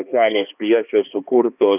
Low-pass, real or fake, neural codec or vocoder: 3.6 kHz; fake; codec, 16 kHz, 2 kbps, X-Codec, HuBERT features, trained on balanced general audio